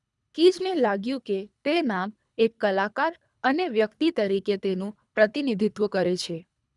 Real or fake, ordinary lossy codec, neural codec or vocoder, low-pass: fake; none; codec, 24 kHz, 3 kbps, HILCodec; 10.8 kHz